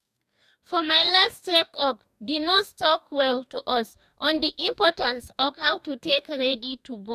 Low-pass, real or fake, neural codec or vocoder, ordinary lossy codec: 14.4 kHz; fake; codec, 44.1 kHz, 2.6 kbps, DAC; none